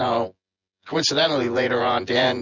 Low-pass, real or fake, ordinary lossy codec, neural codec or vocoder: 7.2 kHz; fake; Opus, 64 kbps; vocoder, 24 kHz, 100 mel bands, Vocos